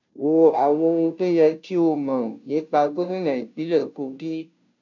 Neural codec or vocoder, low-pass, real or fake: codec, 16 kHz, 0.5 kbps, FunCodec, trained on Chinese and English, 25 frames a second; 7.2 kHz; fake